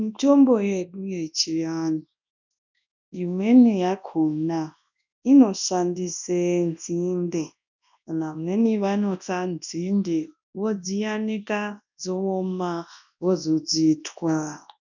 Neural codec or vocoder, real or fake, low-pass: codec, 24 kHz, 0.9 kbps, WavTokenizer, large speech release; fake; 7.2 kHz